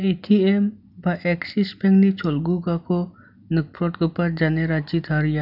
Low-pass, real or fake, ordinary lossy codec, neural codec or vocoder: 5.4 kHz; real; none; none